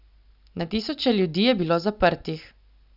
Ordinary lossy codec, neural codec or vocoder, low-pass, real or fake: none; none; 5.4 kHz; real